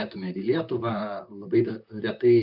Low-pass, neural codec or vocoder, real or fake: 5.4 kHz; vocoder, 44.1 kHz, 128 mel bands, Pupu-Vocoder; fake